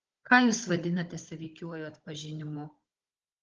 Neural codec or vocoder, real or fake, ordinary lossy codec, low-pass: codec, 16 kHz, 16 kbps, FunCodec, trained on Chinese and English, 50 frames a second; fake; Opus, 16 kbps; 7.2 kHz